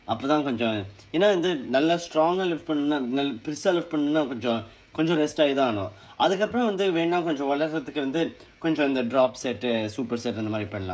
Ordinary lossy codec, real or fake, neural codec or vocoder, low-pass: none; fake; codec, 16 kHz, 16 kbps, FreqCodec, smaller model; none